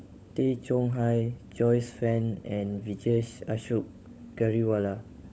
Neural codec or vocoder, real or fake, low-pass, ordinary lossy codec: codec, 16 kHz, 16 kbps, FunCodec, trained on LibriTTS, 50 frames a second; fake; none; none